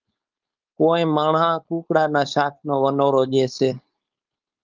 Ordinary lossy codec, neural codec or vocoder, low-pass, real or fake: Opus, 24 kbps; codec, 16 kHz, 4.8 kbps, FACodec; 7.2 kHz; fake